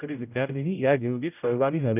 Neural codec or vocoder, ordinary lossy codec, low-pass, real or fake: codec, 16 kHz, 0.5 kbps, X-Codec, HuBERT features, trained on general audio; none; 3.6 kHz; fake